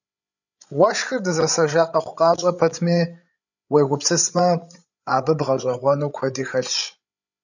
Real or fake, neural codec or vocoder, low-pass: fake; codec, 16 kHz, 8 kbps, FreqCodec, larger model; 7.2 kHz